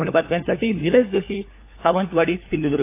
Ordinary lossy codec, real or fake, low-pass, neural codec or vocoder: AAC, 24 kbps; fake; 3.6 kHz; codec, 16 kHz, 4 kbps, FunCodec, trained on LibriTTS, 50 frames a second